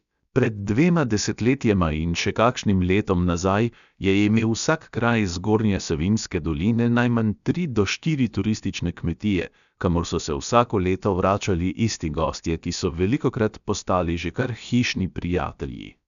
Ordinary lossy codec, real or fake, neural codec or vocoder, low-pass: MP3, 96 kbps; fake; codec, 16 kHz, about 1 kbps, DyCAST, with the encoder's durations; 7.2 kHz